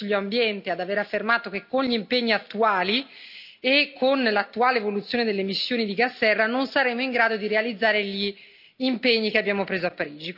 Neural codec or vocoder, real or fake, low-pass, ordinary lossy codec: none; real; 5.4 kHz; none